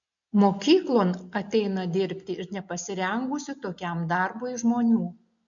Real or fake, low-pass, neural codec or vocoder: real; 7.2 kHz; none